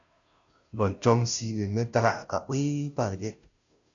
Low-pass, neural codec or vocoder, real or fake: 7.2 kHz; codec, 16 kHz, 0.5 kbps, FunCodec, trained on Chinese and English, 25 frames a second; fake